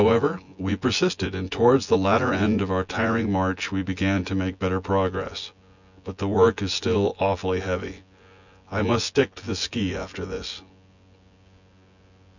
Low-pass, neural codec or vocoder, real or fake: 7.2 kHz; vocoder, 24 kHz, 100 mel bands, Vocos; fake